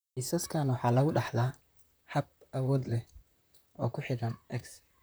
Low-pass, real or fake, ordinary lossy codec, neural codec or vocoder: none; fake; none; vocoder, 44.1 kHz, 128 mel bands, Pupu-Vocoder